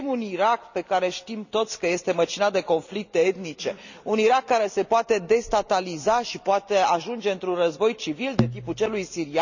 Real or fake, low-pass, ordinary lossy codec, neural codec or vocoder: real; 7.2 kHz; none; none